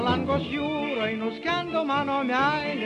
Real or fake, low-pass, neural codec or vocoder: real; 10.8 kHz; none